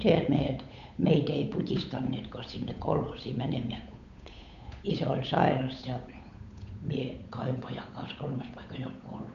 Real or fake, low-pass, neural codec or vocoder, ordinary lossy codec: fake; 7.2 kHz; codec, 16 kHz, 8 kbps, FunCodec, trained on Chinese and English, 25 frames a second; none